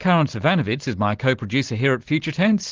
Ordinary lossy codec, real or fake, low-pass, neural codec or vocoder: Opus, 16 kbps; real; 7.2 kHz; none